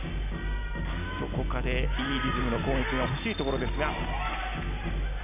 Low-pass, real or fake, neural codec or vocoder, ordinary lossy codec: 3.6 kHz; real; none; none